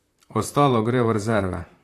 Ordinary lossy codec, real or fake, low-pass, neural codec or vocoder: AAC, 64 kbps; fake; 14.4 kHz; vocoder, 44.1 kHz, 128 mel bands, Pupu-Vocoder